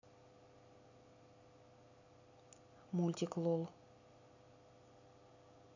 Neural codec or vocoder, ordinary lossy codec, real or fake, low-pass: none; none; real; 7.2 kHz